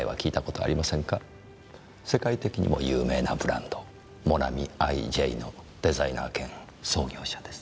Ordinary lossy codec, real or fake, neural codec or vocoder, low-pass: none; real; none; none